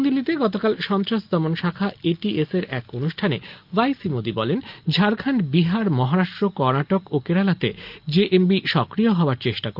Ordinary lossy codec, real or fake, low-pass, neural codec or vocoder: Opus, 32 kbps; real; 5.4 kHz; none